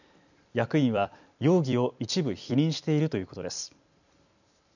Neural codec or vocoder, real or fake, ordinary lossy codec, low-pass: vocoder, 44.1 kHz, 128 mel bands every 256 samples, BigVGAN v2; fake; none; 7.2 kHz